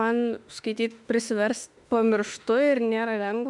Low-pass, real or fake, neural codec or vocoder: 10.8 kHz; fake; autoencoder, 48 kHz, 32 numbers a frame, DAC-VAE, trained on Japanese speech